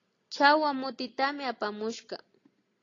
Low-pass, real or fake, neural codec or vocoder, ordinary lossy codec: 7.2 kHz; real; none; AAC, 32 kbps